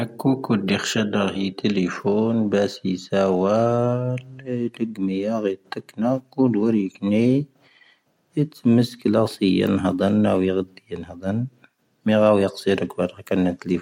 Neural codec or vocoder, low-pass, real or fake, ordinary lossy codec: none; 19.8 kHz; real; MP3, 64 kbps